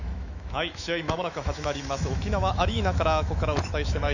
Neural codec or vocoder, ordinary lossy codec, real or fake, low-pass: autoencoder, 48 kHz, 128 numbers a frame, DAC-VAE, trained on Japanese speech; none; fake; 7.2 kHz